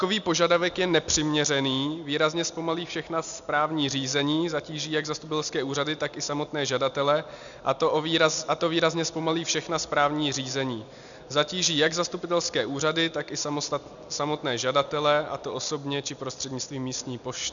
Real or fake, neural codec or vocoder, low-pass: real; none; 7.2 kHz